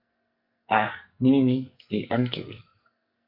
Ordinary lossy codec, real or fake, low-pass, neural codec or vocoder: MP3, 48 kbps; fake; 5.4 kHz; codec, 44.1 kHz, 2.6 kbps, SNAC